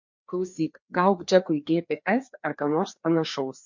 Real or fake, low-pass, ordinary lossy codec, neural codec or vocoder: fake; 7.2 kHz; MP3, 48 kbps; codec, 16 kHz, 2 kbps, FreqCodec, larger model